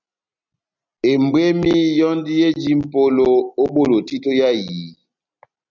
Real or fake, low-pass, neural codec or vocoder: real; 7.2 kHz; none